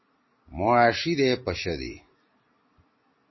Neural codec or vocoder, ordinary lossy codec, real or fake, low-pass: none; MP3, 24 kbps; real; 7.2 kHz